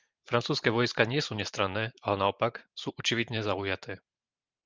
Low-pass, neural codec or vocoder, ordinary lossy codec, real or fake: 7.2 kHz; vocoder, 44.1 kHz, 128 mel bands every 512 samples, BigVGAN v2; Opus, 32 kbps; fake